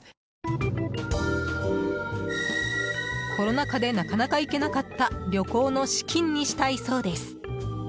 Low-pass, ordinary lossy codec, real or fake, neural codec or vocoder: none; none; real; none